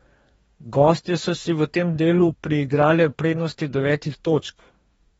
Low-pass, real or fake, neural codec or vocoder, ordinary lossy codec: 19.8 kHz; fake; codec, 44.1 kHz, 2.6 kbps, DAC; AAC, 24 kbps